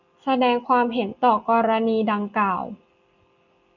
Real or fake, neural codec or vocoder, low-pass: real; none; 7.2 kHz